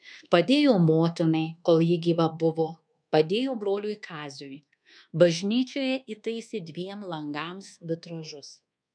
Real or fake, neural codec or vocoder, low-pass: fake; codec, 24 kHz, 1.2 kbps, DualCodec; 9.9 kHz